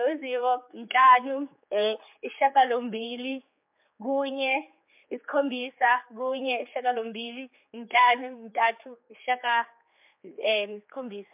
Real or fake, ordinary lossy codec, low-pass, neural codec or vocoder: fake; MP3, 32 kbps; 3.6 kHz; codec, 16 kHz, 4 kbps, X-Codec, HuBERT features, trained on general audio